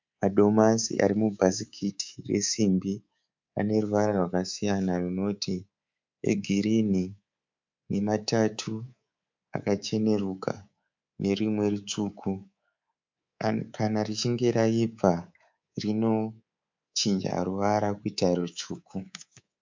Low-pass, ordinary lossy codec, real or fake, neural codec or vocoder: 7.2 kHz; MP3, 64 kbps; fake; codec, 24 kHz, 3.1 kbps, DualCodec